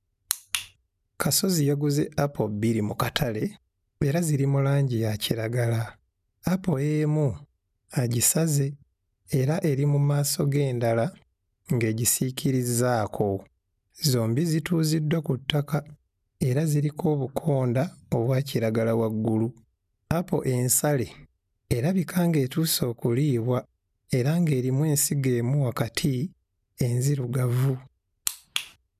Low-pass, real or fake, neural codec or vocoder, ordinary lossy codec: 14.4 kHz; real; none; none